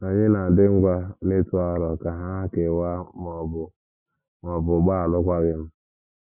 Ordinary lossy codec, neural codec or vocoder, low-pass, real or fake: none; none; 3.6 kHz; real